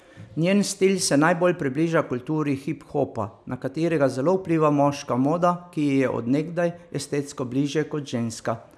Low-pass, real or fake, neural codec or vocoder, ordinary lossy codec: none; real; none; none